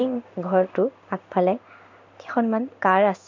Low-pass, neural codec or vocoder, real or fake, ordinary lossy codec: 7.2 kHz; codec, 16 kHz in and 24 kHz out, 1 kbps, XY-Tokenizer; fake; MP3, 64 kbps